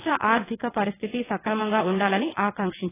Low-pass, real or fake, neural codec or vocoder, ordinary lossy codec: 3.6 kHz; fake; vocoder, 22.05 kHz, 80 mel bands, WaveNeXt; AAC, 16 kbps